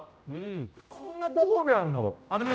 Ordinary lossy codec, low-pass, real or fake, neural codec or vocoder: none; none; fake; codec, 16 kHz, 0.5 kbps, X-Codec, HuBERT features, trained on general audio